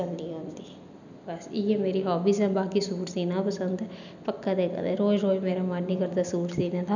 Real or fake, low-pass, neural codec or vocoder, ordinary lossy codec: real; 7.2 kHz; none; none